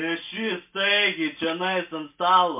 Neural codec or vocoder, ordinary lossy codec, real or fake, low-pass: none; MP3, 32 kbps; real; 3.6 kHz